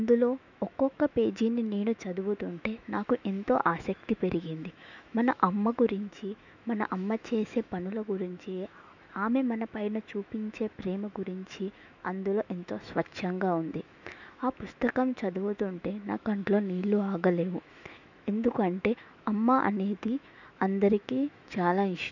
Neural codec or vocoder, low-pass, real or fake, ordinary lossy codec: autoencoder, 48 kHz, 128 numbers a frame, DAC-VAE, trained on Japanese speech; 7.2 kHz; fake; none